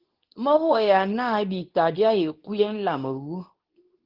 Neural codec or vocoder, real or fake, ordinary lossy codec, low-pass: codec, 24 kHz, 0.9 kbps, WavTokenizer, medium speech release version 2; fake; Opus, 16 kbps; 5.4 kHz